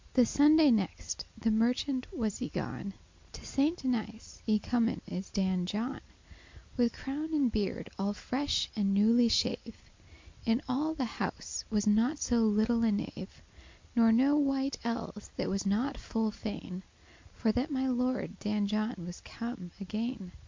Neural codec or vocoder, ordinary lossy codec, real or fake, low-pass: none; MP3, 48 kbps; real; 7.2 kHz